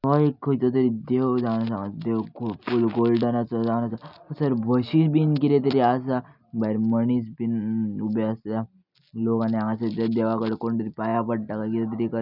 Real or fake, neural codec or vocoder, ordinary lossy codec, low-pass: real; none; none; 5.4 kHz